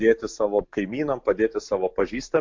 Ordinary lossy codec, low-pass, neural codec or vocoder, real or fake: MP3, 48 kbps; 7.2 kHz; none; real